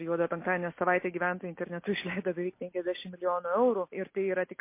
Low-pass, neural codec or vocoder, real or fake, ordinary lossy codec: 3.6 kHz; none; real; MP3, 24 kbps